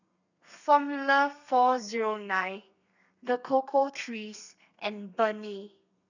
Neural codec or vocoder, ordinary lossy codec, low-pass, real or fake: codec, 32 kHz, 1.9 kbps, SNAC; none; 7.2 kHz; fake